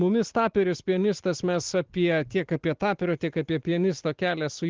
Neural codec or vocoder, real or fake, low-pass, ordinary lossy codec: none; real; 7.2 kHz; Opus, 16 kbps